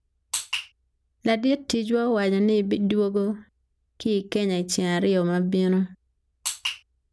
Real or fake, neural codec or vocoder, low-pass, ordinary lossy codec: real; none; none; none